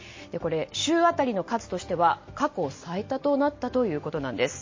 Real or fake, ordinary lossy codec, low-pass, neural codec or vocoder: real; MP3, 32 kbps; 7.2 kHz; none